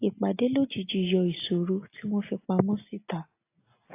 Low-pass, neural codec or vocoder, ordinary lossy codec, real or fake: 3.6 kHz; none; AAC, 24 kbps; real